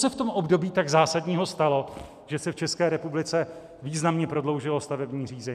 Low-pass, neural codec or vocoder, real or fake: 14.4 kHz; none; real